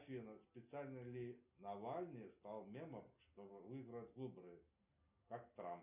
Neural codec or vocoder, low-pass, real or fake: none; 3.6 kHz; real